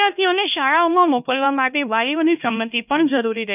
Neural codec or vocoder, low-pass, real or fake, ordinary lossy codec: codec, 16 kHz, 1 kbps, X-Codec, HuBERT features, trained on LibriSpeech; 3.6 kHz; fake; none